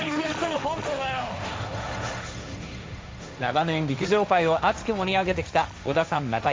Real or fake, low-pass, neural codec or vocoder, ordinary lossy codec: fake; none; codec, 16 kHz, 1.1 kbps, Voila-Tokenizer; none